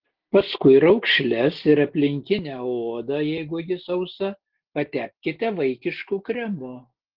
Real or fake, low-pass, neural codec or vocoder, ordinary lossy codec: real; 5.4 kHz; none; Opus, 16 kbps